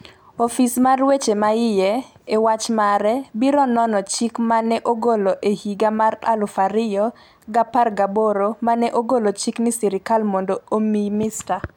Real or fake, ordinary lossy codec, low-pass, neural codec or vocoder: real; none; 19.8 kHz; none